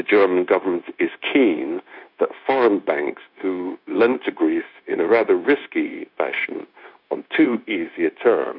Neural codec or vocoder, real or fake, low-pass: codec, 16 kHz, 0.9 kbps, LongCat-Audio-Codec; fake; 5.4 kHz